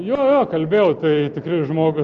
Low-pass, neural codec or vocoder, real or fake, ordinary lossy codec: 7.2 kHz; none; real; Opus, 24 kbps